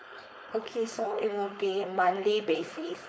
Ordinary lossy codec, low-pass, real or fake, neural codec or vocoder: none; none; fake; codec, 16 kHz, 4.8 kbps, FACodec